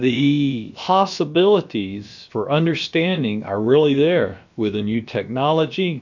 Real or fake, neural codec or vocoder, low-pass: fake; codec, 16 kHz, about 1 kbps, DyCAST, with the encoder's durations; 7.2 kHz